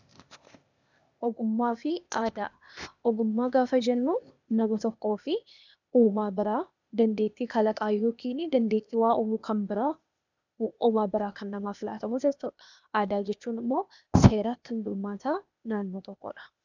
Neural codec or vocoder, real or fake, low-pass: codec, 16 kHz, 0.8 kbps, ZipCodec; fake; 7.2 kHz